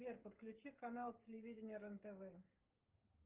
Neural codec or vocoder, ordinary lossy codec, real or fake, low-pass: codec, 16 kHz, 16 kbps, FreqCodec, smaller model; Opus, 32 kbps; fake; 3.6 kHz